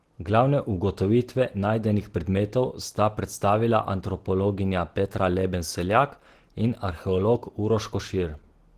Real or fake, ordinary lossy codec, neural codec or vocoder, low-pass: real; Opus, 16 kbps; none; 14.4 kHz